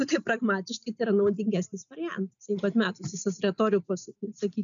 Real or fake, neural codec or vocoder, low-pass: real; none; 7.2 kHz